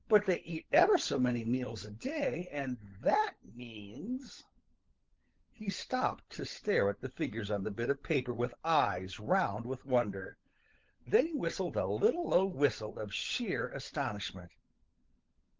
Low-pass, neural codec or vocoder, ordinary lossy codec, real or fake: 7.2 kHz; codec, 16 kHz, 16 kbps, FunCodec, trained on LibriTTS, 50 frames a second; Opus, 16 kbps; fake